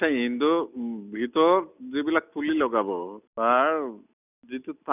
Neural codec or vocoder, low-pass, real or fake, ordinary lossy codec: none; 3.6 kHz; real; none